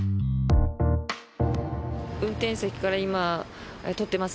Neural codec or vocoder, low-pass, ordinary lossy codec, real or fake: none; none; none; real